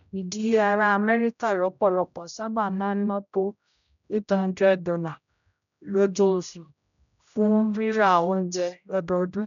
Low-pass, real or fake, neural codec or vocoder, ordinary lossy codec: 7.2 kHz; fake; codec, 16 kHz, 0.5 kbps, X-Codec, HuBERT features, trained on general audio; MP3, 96 kbps